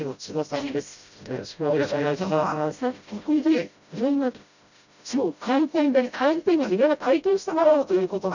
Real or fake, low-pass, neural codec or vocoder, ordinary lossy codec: fake; 7.2 kHz; codec, 16 kHz, 0.5 kbps, FreqCodec, smaller model; none